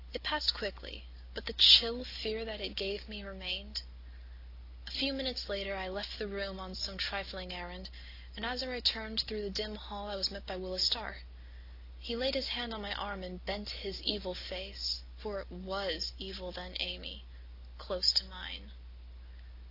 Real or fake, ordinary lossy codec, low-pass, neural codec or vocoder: real; AAC, 32 kbps; 5.4 kHz; none